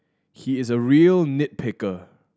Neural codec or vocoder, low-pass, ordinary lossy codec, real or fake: none; none; none; real